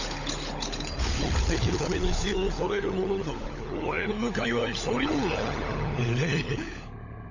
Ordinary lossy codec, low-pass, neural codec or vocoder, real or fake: none; 7.2 kHz; codec, 16 kHz, 16 kbps, FunCodec, trained on LibriTTS, 50 frames a second; fake